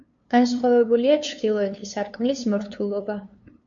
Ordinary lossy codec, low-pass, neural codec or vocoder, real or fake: MP3, 48 kbps; 7.2 kHz; codec, 16 kHz, 2 kbps, FunCodec, trained on LibriTTS, 25 frames a second; fake